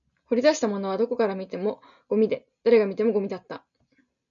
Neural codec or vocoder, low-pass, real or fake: none; 7.2 kHz; real